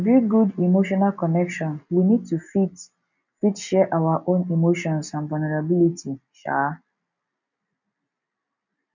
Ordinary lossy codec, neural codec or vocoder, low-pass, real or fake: none; none; 7.2 kHz; real